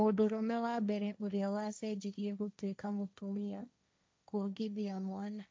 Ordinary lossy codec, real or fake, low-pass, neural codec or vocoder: none; fake; none; codec, 16 kHz, 1.1 kbps, Voila-Tokenizer